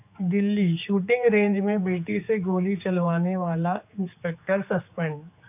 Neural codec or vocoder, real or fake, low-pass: codec, 16 kHz, 4 kbps, X-Codec, HuBERT features, trained on general audio; fake; 3.6 kHz